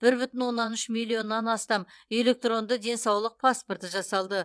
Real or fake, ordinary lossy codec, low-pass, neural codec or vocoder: fake; none; none; vocoder, 22.05 kHz, 80 mel bands, WaveNeXt